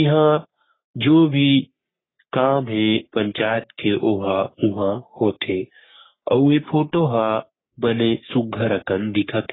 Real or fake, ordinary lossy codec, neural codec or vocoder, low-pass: fake; AAC, 16 kbps; codec, 44.1 kHz, 3.4 kbps, Pupu-Codec; 7.2 kHz